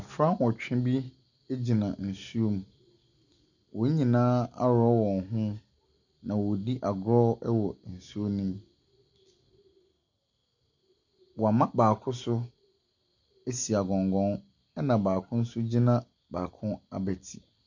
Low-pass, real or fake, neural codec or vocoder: 7.2 kHz; real; none